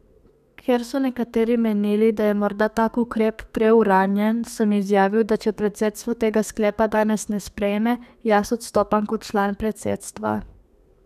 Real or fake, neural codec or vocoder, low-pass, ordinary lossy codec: fake; codec, 32 kHz, 1.9 kbps, SNAC; 14.4 kHz; none